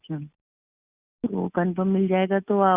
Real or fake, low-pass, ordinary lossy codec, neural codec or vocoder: real; 3.6 kHz; Opus, 64 kbps; none